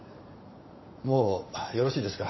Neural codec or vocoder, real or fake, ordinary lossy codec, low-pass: vocoder, 44.1 kHz, 80 mel bands, Vocos; fake; MP3, 24 kbps; 7.2 kHz